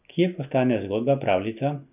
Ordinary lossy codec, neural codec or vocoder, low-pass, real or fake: none; none; 3.6 kHz; real